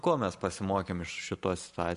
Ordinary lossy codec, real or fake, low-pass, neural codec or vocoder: MP3, 48 kbps; real; 10.8 kHz; none